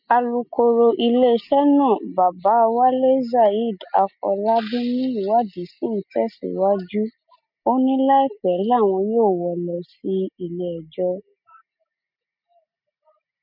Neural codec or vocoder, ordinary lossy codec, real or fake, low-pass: none; MP3, 48 kbps; real; 5.4 kHz